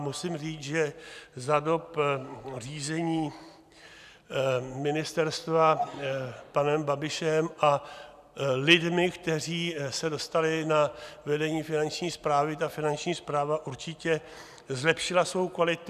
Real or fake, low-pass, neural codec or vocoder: real; 14.4 kHz; none